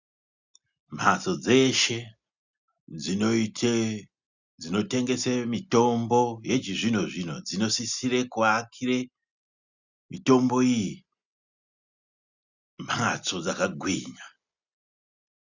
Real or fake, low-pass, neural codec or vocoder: real; 7.2 kHz; none